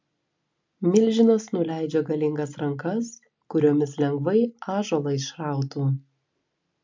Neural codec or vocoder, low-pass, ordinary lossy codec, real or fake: none; 7.2 kHz; MP3, 64 kbps; real